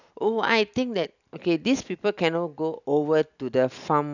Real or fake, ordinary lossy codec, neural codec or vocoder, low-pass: real; none; none; 7.2 kHz